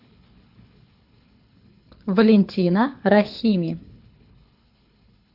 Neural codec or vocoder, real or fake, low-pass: codec, 24 kHz, 6 kbps, HILCodec; fake; 5.4 kHz